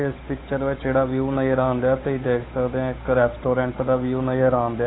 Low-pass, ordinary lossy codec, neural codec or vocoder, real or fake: 7.2 kHz; AAC, 16 kbps; codec, 16 kHz, 8 kbps, FunCodec, trained on Chinese and English, 25 frames a second; fake